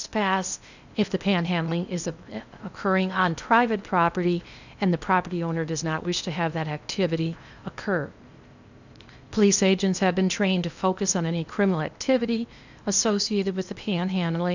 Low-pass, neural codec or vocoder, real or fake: 7.2 kHz; codec, 16 kHz in and 24 kHz out, 0.8 kbps, FocalCodec, streaming, 65536 codes; fake